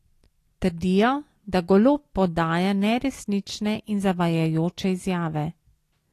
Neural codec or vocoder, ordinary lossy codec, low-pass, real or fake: none; AAC, 48 kbps; 14.4 kHz; real